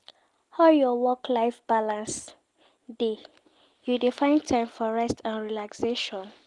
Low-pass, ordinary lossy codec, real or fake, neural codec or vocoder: 10.8 kHz; Opus, 24 kbps; real; none